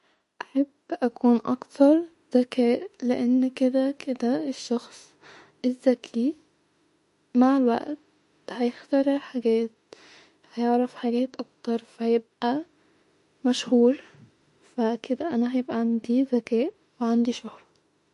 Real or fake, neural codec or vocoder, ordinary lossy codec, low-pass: fake; autoencoder, 48 kHz, 32 numbers a frame, DAC-VAE, trained on Japanese speech; MP3, 48 kbps; 14.4 kHz